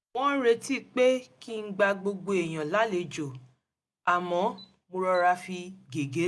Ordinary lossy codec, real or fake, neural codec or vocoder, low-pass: none; real; none; none